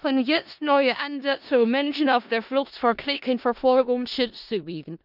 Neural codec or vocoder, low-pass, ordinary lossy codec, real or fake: codec, 16 kHz in and 24 kHz out, 0.4 kbps, LongCat-Audio-Codec, four codebook decoder; 5.4 kHz; none; fake